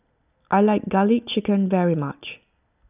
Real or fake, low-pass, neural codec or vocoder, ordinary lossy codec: real; 3.6 kHz; none; none